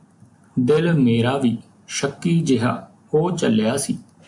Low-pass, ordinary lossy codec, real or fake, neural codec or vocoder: 10.8 kHz; MP3, 64 kbps; real; none